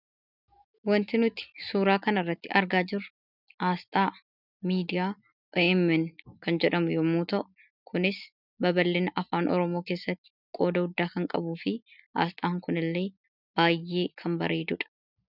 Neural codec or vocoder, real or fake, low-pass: none; real; 5.4 kHz